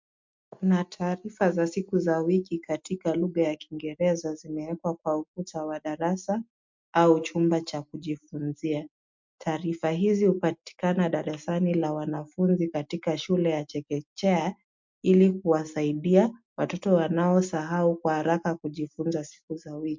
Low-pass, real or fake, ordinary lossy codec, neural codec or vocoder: 7.2 kHz; real; MP3, 64 kbps; none